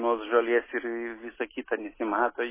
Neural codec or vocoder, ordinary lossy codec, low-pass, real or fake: none; MP3, 16 kbps; 3.6 kHz; real